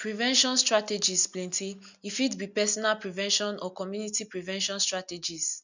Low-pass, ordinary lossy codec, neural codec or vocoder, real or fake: 7.2 kHz; none; none; real